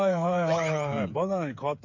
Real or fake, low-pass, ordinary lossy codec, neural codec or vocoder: fake; 7.2 kHz; MP3, 64 kbps; codec, 16 kHz, 8 kbps, FreqCodec, smaller model